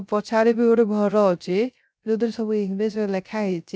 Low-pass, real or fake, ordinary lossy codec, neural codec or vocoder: none; fake; none; codec, 16 kHz, 0.3 kbps, FocalCodec